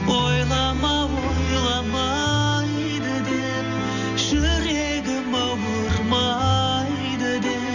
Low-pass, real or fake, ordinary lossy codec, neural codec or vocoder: 7.2 kHz; real; none; none